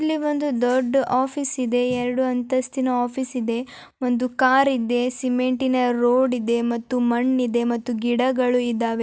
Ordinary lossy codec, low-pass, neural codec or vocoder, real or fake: none; none; none; real